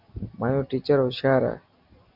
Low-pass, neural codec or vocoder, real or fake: 5.4 kHz; none; real